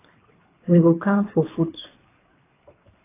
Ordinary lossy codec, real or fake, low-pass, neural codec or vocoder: AAC, 16 kbps; fake; 3.6 kHz; codec, 24 kHz, 3 kbps, HILCodec